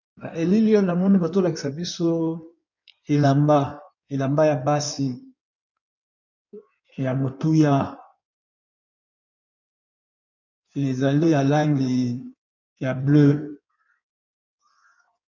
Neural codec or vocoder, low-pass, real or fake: codec, 16 kHz in and 24 kHz out, 1.1 kbps, FireRedTTS-2 codec; 7.2 kHz; fake